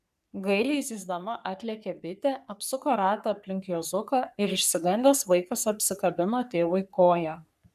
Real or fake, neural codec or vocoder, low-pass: fake; codec, 44.1 kHz, 3.4 kbps, Pupu-Codec; 14.4 kHz